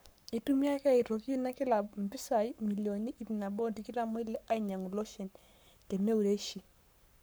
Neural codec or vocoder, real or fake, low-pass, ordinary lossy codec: codec, 44.1 kHz, 7.8 kbps, Pupu-Codec; fake; none; none